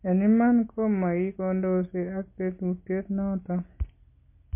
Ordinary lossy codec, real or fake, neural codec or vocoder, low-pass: MP3, 24 kbps; real; none; 3.6 kHz